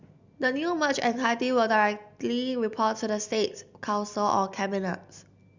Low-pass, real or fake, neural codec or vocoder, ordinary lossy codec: 7.2 kHz; real; none; Opus, 64 kbps